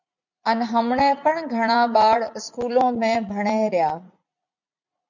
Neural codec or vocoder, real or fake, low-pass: vocoder, 44.1 kHz, 80 mel bands, Vocos; fake; 7.2 kHz